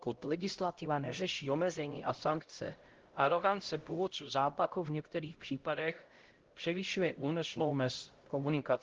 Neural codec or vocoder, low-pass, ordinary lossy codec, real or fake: codec, 16 kHz, 0.5 kbps, X-Codec, HuBERT features, trained on LibriSpeech; 7.2 kHz; Opus, 16 kbps; fake